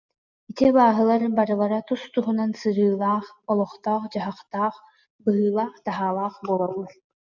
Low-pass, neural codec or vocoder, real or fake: 7.2 kHz; none; real